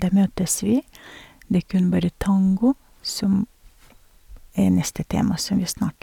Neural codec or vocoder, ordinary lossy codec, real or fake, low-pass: none; none; real; 19.8 kHz